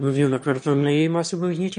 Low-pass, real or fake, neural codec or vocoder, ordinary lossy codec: 9.9 kHz; fake; autoencoder, 22.05 kHz, a latent of 192 numbers a frame, VITS, trained on one speaker; MP3, 48 kbps